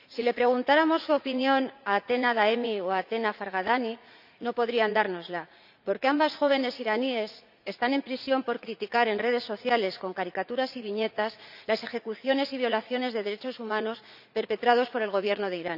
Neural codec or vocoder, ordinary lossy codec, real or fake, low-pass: vocoder, 44.1 kHz, 80 mel bands, Vocos; none; fake; 5.4 kHz